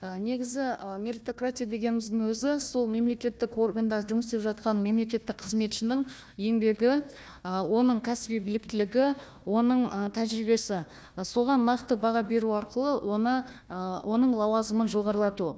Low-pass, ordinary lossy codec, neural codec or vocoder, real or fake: none; none; codec, 16 kHz, 1 kbps, FunCodec, trained on Chinese and English, 50 frames a second; fake